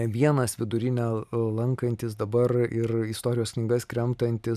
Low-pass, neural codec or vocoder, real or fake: 14.4 kHz; none; real